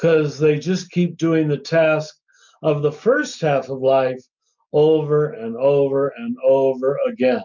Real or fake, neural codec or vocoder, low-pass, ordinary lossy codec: real; none; 7.2 kHz; MP3, 64 kbps